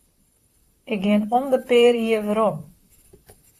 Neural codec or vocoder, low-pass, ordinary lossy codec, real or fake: vocoder, 44.1 kHz, 128 mel bands, Pupu-Vocoder; 14.4 kHz; AAC, 64 kbps; fake